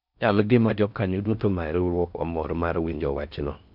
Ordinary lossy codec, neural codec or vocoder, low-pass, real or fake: none; codec, 16 kHz in and 24 kHz out, 0.6 kbps, FocalCodec, streaming, 4096 codes; 5.4 kHz; fake